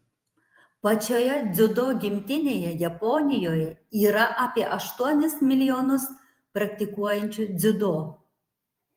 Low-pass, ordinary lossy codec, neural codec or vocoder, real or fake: 19.8 kHz; Opus, 32 kbps; vocoder, 48 kHz, 128 mel bands, Vocos; fake